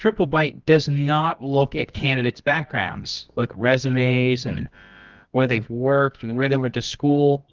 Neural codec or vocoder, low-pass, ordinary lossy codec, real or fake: codec, 24 kHz, 0.9 kbps, WavTokenizer, medium music audio release; 7.2 kHz; Opus, 32 kbps; fake